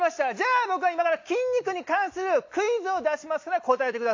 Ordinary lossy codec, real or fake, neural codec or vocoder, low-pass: AAC, 48 kbps; real; none; 7.2 kHz